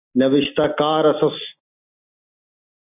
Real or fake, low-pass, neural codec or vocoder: real; 3.6 kHz; none